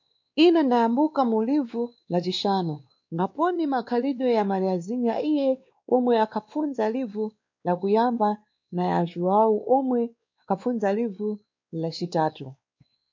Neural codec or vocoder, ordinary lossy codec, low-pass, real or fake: codec, 16 kHz, 2 kbps, X-Codec, WavLM features, trained on Multilingual LibriSpeech; MP3, 48 kbps; 7.2 kHz; fake